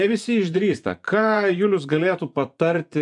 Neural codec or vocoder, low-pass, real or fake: vocoder, 24 kHz, 100 mel bands, Vocos; 10.8 kHz; fake